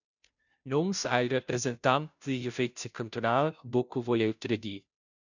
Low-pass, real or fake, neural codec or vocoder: 7.2 kHz; fake; codec, 16 kHz, 0.5 kbps, FunCodec, trained on Chinese and English, 25 frames a second